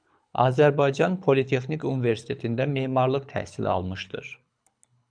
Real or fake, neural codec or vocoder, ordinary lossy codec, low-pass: fake; codec, 24 kHz, 6 kbps, HILCodec; MP3, 96 kbps; 9.9 kHz